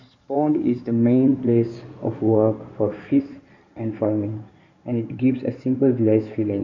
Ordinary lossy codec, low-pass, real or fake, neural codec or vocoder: none; 7.2 kHz; fake; codec, 16 kHz in and 24 kHz out, 2.2 kbps, FireRedTTS-2 codec